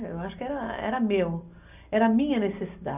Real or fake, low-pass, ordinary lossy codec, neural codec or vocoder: real; 3.6 kHz; none; none